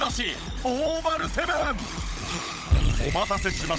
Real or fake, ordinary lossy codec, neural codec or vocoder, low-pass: fake; none; codec, 16 kHz, 16 kbps, FunCodec, trained on LibriTTS, 50 frames a second; none